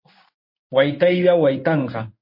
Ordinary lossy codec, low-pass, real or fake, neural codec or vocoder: MP3, 32 kbps; 5.4 kHz; fake; codec, 16 kHz in and 24 kHz out, 1 kbps, XY-Tokenizer